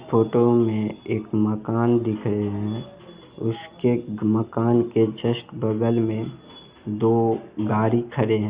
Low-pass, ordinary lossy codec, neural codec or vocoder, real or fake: 3.6 kHz; Opus, 64 kbps; none; real